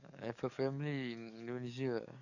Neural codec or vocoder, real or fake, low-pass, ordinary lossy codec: codec, 44.1 kHz, 7.8 kbps, DAC; fake; 7.2 kHz; none